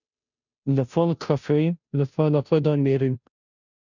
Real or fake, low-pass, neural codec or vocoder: fake; 7.2 kHz; codec, 16 kHz, 0.5 kbps, FunCodec, trained on Chinese and English, 25 frames a second